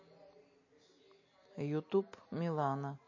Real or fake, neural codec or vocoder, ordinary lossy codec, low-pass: real; none; MP3, 32 kbps; 7.2 kHz